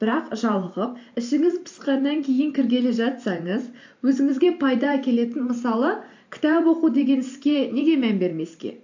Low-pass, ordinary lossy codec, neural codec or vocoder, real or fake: 7.2 kHz; AAC, 48 kbps; vocoder, 44.1 kHz, 128 mel bands every 512 samples, BigVGAN v2; fake